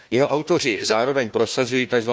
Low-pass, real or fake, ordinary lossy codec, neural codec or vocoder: none; fake; none; codec, 16 kHz, 1 kbps, FunCodec, trained on Chinese and English, 50 frames a second